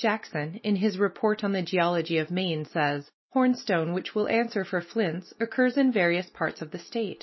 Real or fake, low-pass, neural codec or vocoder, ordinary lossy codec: real; 7.2 kHz; none; MP3, 24 kbps